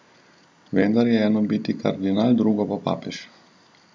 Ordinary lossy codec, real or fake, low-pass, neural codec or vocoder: none; real; none; none